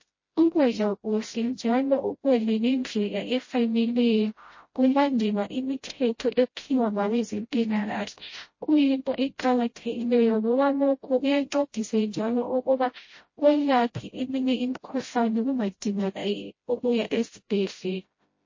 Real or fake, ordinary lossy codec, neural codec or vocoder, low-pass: fake; MP3, 32 kbps; codec, 16 kHz, 0.5 kbps, FreqCodec, smaller model; 7.2 kHz